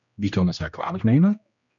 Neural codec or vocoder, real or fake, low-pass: codec, 16 kHz, 1 kbps, X-Codec, HuBERT features, trained on general audio; fake; 7.2 kHz